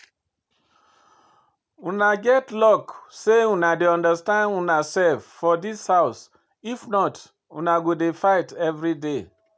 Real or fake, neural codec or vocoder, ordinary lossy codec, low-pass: real; none; none; none